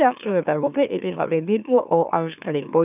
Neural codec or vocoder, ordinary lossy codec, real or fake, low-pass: autoencoder, 44.1 kHz, a latent of 192 numbers a frame, MeloTTS; none; fake; 3.6 kHz